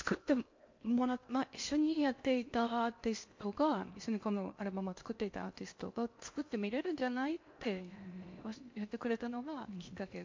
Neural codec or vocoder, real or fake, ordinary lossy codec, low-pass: codec, 16 kHz in and 24 kHz out, 0.8 kbps, FocalCodec, streaming, 65536 codes; fake; MP3, 48 kbps; 7.2 kHz